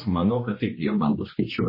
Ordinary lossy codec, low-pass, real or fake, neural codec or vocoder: MP3, 24 kbps; 5.4 kHz; fake; codec, 16 kHz, 2 kbps, X-Codec, HuBERT features, trained on general audio